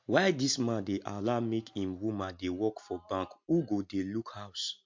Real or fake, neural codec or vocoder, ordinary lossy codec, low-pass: real; none; MP3, 48 kbps; 7.2 kHz